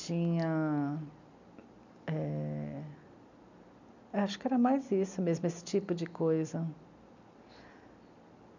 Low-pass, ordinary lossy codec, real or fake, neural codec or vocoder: 7.2 kHz; none; real; none